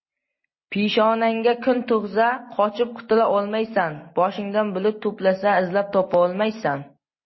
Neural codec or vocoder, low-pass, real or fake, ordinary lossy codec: none; 7.2 kHz; real; MP3, 24 kbps